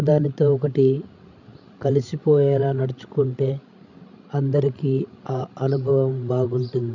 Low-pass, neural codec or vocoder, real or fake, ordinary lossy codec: 7.2 kHz; codec, 16 kHz, 16 kbps, FreqCodec, larger model; fake; none